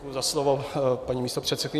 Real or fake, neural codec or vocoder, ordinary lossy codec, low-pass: real; none; MP3, 96 kbps; 14.4 kHz